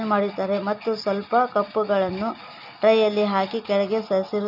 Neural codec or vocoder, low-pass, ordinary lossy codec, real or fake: none; 5.4 kHz; none; real